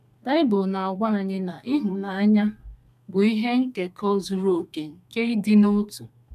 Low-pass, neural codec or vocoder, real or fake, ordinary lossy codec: 14.4 kHz; codec, 32 kHz, 1.9 kbps, SNAC; fake; none